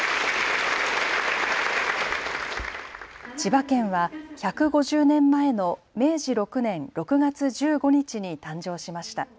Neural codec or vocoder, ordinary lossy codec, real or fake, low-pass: none; none; real; none